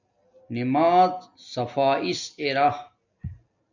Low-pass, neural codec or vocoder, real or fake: 7.2 kHz; none; real